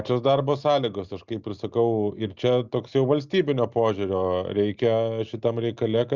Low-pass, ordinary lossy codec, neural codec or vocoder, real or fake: 7.2 kHz; Opus, 64 kbps; none; real